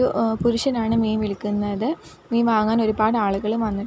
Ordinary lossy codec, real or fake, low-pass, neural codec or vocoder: none; real; none; none